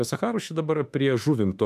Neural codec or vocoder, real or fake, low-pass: autoencoder, 48 kHz, 32 numbers a frame, DAC-VAE, trained on Japanese speech; fake; 14.4 kHz